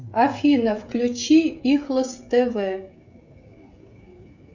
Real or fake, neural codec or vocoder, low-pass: fake; codec, 16 kHz, 16 kbps, FreqCodec, smaller model; 7.2 kHz